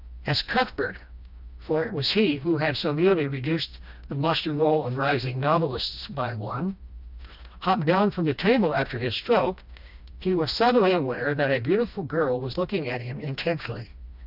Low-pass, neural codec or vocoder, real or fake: 5.4 kHz; codec, 16 kHz, 1 kbps, FreqCodec, smaller model; fake